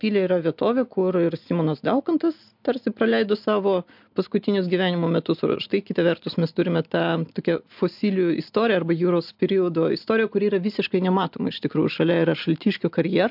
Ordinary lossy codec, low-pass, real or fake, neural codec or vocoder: MP3, 48 kbps; 5.4 kHz; real; none